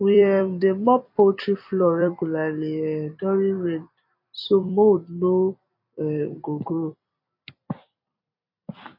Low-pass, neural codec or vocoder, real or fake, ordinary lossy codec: 5.4 kHz; vocoder, 44.1 kHz, 128 mel bands every 256 samples, BigVGAN v2; fake; MP3, 32 kbps